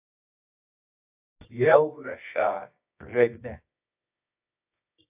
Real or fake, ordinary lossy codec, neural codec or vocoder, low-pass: fake; AAC, 32 kbps; codec, 24 kHz, 0.9 kbps, WavTokenizer, medium music audio release; 3.6 kHz